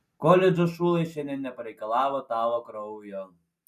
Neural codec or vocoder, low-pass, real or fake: none; 14.4 kHz; real